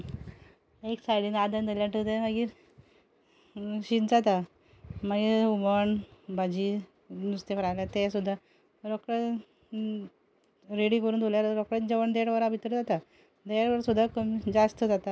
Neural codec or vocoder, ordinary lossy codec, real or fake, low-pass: none; none; real; none